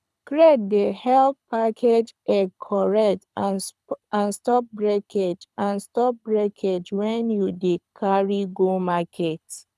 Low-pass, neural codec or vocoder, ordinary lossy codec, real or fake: none; codec, 24 kHz, 6 kbps, HILCodec; none; fake